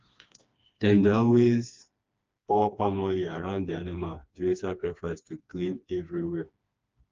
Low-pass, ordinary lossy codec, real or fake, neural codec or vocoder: 7.2 kHz; Opus, 24 kbps; fake; codec, 16 kHz, 2 kbps, FreqCodec, smaller model